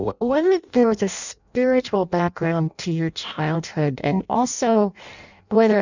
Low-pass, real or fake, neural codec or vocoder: 7.2 kHz; fake; codec, 16 kHz in and 24 kHz out, 0.6 kbps, FireRedTTS-2 codec